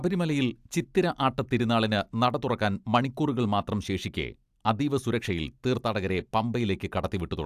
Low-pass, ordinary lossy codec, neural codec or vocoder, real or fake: 14.4 kHz; AAC, 96 kbps; none; real